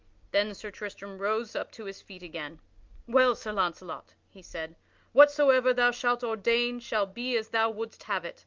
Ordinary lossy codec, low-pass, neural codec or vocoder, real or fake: Opus, 24 kbps; 7.2 kHz; none; real